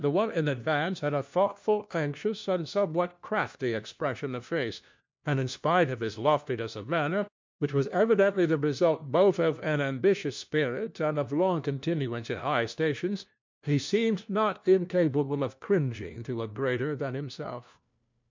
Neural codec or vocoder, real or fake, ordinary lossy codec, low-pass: codec, 16 kHz, 1 kbps, FunCodec, trained on LibriTTS, 50 frames a second; fake; MP3, 64 kbps; 7.2 kHz